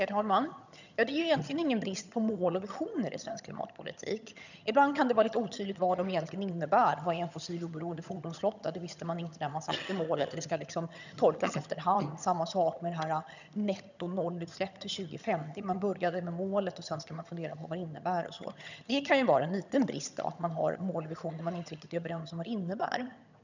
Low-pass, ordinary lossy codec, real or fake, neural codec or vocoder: 7.2 kHz; none; fake; vocoder, 22.05 kHz, 80 mel bands, HiFi-GAN